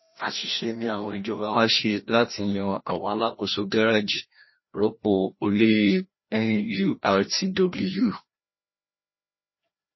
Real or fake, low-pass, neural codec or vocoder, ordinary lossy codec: fake; 7.2 kHz; codec, 16 kHz, 1 kbps, FreqCodec, larger model; MP3, 24 kbps